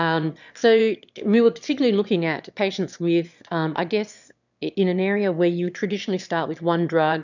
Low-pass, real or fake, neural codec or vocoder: 7.2 kHz; fake; autoencoder, 22.05 kHz, a latent of 192 numbers a frame, VITS, trained on one speaker